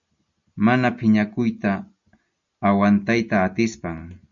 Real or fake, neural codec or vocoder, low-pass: real; none; 7.2 kHz